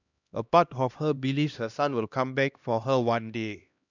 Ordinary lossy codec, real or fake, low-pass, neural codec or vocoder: none; fake; 7.2 kHz; codec, 16 kHz, 1 kbps, X-Codec, HuBERT features, trained on LibriSpeech